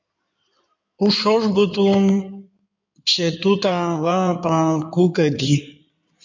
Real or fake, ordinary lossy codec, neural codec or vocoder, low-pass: fake; MP3, 64 kbps; codec, 16 kHz in and 24 kHz out, 2.2 kbps, FireRedTTS-2 codec; 7.2 kHz